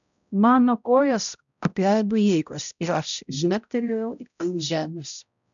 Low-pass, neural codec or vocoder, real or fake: 7.2 kHz; codec, 16 kHz, 0.5 kbps, X-Codec, HuBERT features, trained on balanced general audio; fake